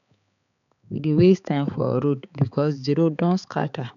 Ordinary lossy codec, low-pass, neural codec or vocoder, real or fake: none; 7.2 kHz; codec, 16 kHz, 4 kbps, X-Codec, HuBERT features, trained on balanced general audio; fake